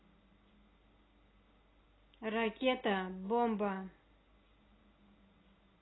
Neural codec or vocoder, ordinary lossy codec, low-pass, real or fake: none; AAC, 16 kbps; 7.2 kHz; real